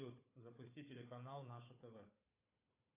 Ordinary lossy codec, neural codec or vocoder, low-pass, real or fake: AAC, 16 kbps; codec, 16 kHz, 4 kbps, FunCodec, trained on Chinese and English, 50 frames a second; 3.6 kHz; fake